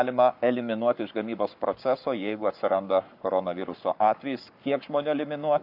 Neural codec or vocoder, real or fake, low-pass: codec, 44.1 kHz, 7.8 kbps, Pupu-Codec; fake; 5.4 kHz